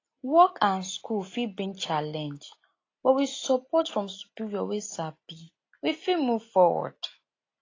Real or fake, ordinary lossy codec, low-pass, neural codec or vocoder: real; AAC, 32 kbps; 7.2 kHz; none